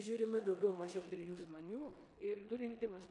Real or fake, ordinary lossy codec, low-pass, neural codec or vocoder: fake; MP3, 64 kbps; 10.8 kHz; codec, 16 kHz in and 24 kHz out, 0.9 kbps, LongCat-Audio-Codec, four codebook decoder